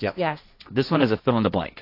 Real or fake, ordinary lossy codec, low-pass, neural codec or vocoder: fake; AAC, 32 kbps; 5.4 kHz; codec, 16 kHz, 1.1 kbps, Voila-Tokenizer